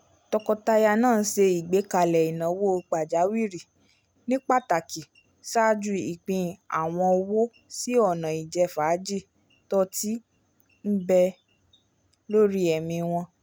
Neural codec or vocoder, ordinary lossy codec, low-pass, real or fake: none; none; none; real